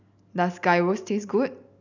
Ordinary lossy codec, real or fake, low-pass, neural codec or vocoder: none; real; 7.2 kHz; none